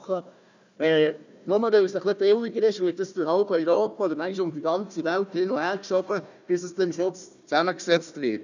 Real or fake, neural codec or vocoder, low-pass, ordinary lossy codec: fake; codec, 16 kHz, 1 kbps, FunCodec, trained on Chinese and English, 50 frames a second; 7.2 kHz; none